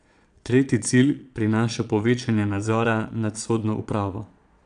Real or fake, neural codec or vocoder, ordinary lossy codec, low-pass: fake; vocoder, 22.05 kHz, 80 mel bands, Vocos; none; 9.9 kHz